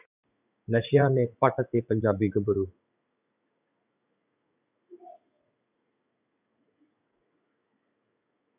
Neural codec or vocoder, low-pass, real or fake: vocoder, 44.1 kHz, 80 mel bands, Vocos; 3.6 kHz; fake